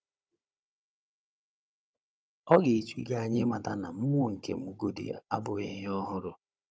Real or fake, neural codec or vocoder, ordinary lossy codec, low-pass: fake; codec, 16 kHz, 16 kbps, FunCodec, trained on Chinese and English, 50 frames a second; none; none